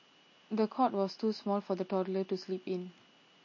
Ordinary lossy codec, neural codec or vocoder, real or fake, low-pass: MP3, 32 kbps; none; real; 7.2 kHz